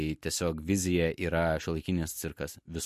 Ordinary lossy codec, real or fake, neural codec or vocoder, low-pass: MP3, 64 kbps; real; none; 14.4 kHz